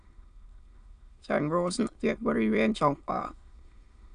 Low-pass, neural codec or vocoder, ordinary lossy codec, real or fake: 9.9 kHz; autoencoder, 22.05 kHz, a latent of 192 numbers a frame, VITS, trained on many speakers; none; fake